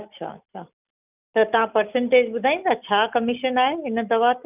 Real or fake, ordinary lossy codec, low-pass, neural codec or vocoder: real; none; 3.6 kHz; none